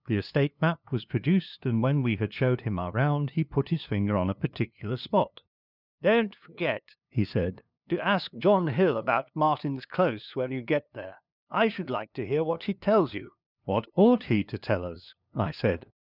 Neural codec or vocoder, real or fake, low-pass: codec, 16 kHz, 2 kbps, FunCodec, trained on LibriTTS, 25 frames a second; fake; 5.4 kHz